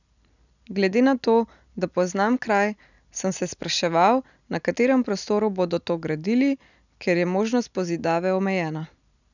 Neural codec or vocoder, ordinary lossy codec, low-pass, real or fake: none; none; 7.2 kHz; real